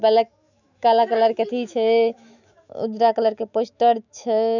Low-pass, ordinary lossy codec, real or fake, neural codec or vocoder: 7.2 kHz; none; fake; autoencoder, 48 kHz, 128 numbers a frame, DAC-VAE, trained on Japanese speech